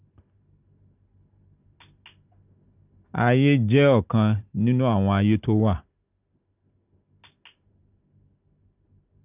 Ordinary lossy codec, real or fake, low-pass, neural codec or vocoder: none; real; 3.6 kHz; none